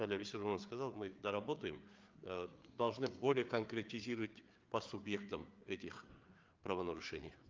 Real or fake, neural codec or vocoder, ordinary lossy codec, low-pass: fake; codec, 16 kHz, 4 kbps, FreqCodec, larger model; Opus, 24 kbps; 7.2 kHz